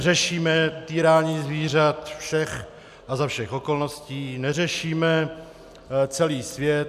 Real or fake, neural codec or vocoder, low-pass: real; none; 14.4 kHz